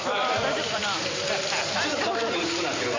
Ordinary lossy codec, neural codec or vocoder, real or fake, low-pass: MP3, 32 kbps; vocoder, 44.1 kHz, 128 mel bands every 256 samples, BigVGAN v2; fake; 7.2 kHz